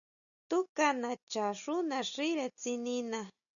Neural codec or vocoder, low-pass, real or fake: none; 7.2 kHz; real